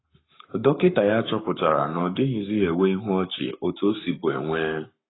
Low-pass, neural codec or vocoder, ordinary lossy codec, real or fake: 7.2 kHz; codec, 44.1 kHz, 7.8 kbps, Pupu-Codec; AAC, 16 kbps; fake